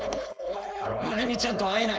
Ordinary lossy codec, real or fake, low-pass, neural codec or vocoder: none; fake; none; codec, 16 kHz, 4.8 kbps, FACodec